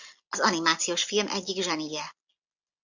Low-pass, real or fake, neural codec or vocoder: 7.2 kHz; real; none